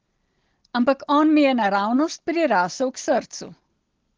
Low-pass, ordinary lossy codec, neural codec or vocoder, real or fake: 7.2 kHz; Opus, 16 kbps; none; real